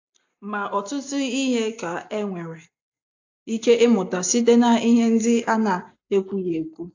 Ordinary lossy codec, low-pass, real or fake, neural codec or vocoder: AAC, 48 kbps; 7.2 kHz; real; none